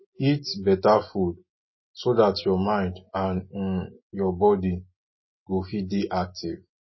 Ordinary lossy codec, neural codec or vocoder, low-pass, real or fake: MP3, 24 kbps; none; 7.2 kHz; real